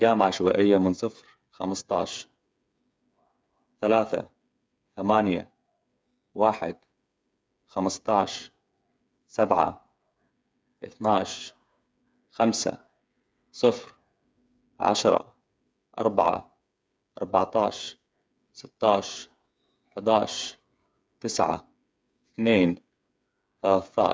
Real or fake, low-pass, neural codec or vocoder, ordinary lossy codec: fake; none; codec, 16 kHz, 8 kbps, FreqCodec, smaller model; none